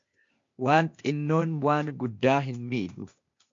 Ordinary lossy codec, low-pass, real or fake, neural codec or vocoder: MP3, 48 kbps; 7.2 kHz; fake; codec, 16 kHz, 0.8 kbps, ZipCodec